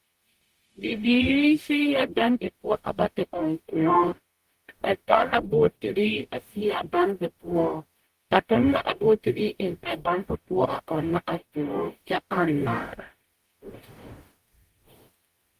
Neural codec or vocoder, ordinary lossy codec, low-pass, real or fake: codec, 44.1 kHz, 0.9 kbps, DAC; Opus, 24 kbps; 14.4 kHz; fake